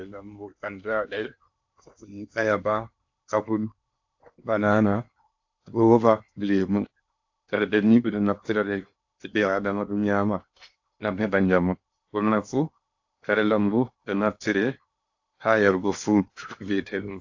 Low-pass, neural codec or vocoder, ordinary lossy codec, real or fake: 7.2 kHz; codec, 16 kHz in and 24 kHz out, 0.8 kbps, FocalCodec, streaming, 65536 codes; AAC, 48 kbps; fake